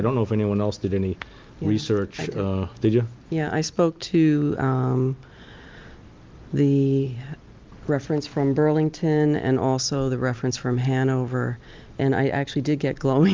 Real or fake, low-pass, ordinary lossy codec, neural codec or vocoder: real; 7.2 kHz; Opus, 32 kbps; none